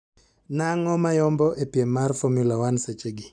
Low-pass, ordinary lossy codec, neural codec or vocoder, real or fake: 9.9 kHz; none; none; real